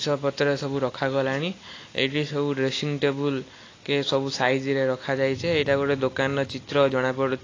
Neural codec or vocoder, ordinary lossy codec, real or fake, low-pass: none; AAC, 32 kbps; real; 7.2 kHz